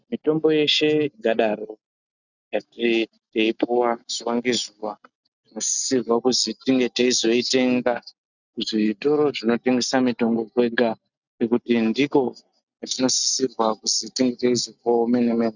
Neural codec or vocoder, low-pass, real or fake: none; 7.2 kHz; real